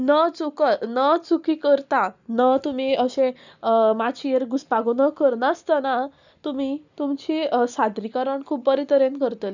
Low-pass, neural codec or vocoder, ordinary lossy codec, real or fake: 7.2 kHz; none; none; real